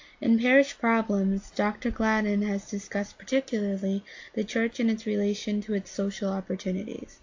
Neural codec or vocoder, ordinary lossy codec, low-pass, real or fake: none; AAC, 48 kbps; 7.2 kHz; real